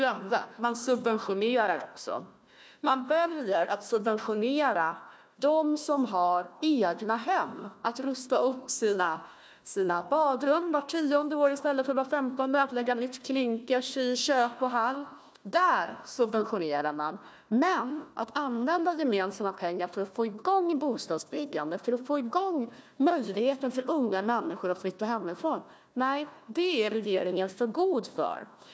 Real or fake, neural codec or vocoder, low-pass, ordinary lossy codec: fake; codec, 16 kHz, 1 kbps, FunCodec, trained on Chinese and English, 50 frames a second; none; none